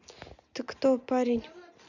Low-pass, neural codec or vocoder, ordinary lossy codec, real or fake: 7.2 kHz; none; none; real